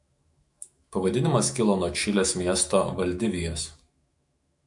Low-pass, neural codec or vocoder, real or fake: 10.8 kHz; autoencoder, 48 kHz, 128 numbers a frame, DAC-VAE, trained on Japanese speech; fake